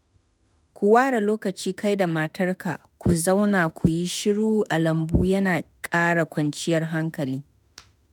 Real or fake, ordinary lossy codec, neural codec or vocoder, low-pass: fake; none; autoencoder, 48 kHz, 32 numbers a frame, DAC-VAE, trained on Japanese speech; none